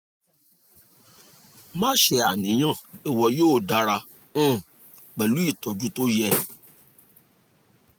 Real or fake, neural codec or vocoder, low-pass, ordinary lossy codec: real; none; none; none